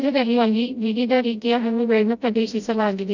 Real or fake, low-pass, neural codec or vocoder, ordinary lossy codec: fake; 7.2 kHz; codec, 16 kHz, 0.5 kbps, FreqCodec, smaller model; none